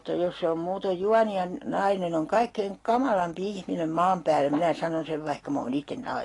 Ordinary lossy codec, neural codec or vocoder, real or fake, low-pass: AAC, 32 kbps; none; real; 10.8 kHz